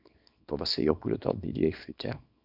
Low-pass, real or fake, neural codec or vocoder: 5.4 kHz; fake; codec, 24 kHz, 0.9 kbps, WavTokenizer, small release